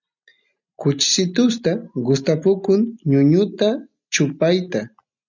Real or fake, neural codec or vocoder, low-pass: real; none; 7.2 kHz